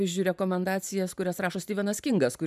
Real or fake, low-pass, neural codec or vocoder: fake; 14.4 kHz; vocoder, 44.1 kHz, 128 mel bands every 512 samples, BigVGAN v2